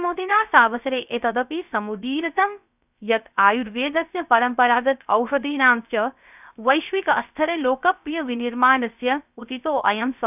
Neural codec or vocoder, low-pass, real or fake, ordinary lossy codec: codec, 16 kHz, 0.3 kbps, FocalCodec; 3.6 kHz; fake; none